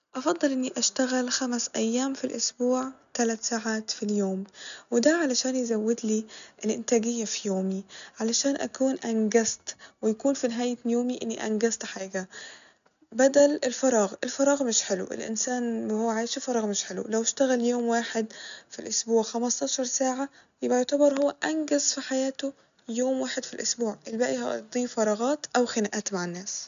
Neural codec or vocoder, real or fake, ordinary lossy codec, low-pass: none; real; none; 7.2 kHz